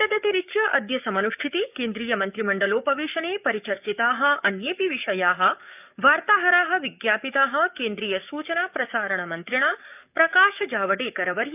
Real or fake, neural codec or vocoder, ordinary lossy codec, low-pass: fake; codec, 44.1 kHz, 7.8 kbps, DAC; none; 3.6 kHz